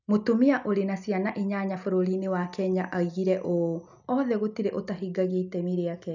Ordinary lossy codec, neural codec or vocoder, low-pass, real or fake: none; none; 7.2 kHz; real